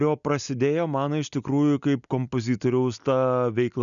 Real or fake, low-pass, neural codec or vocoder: real; 7.2 kHz; none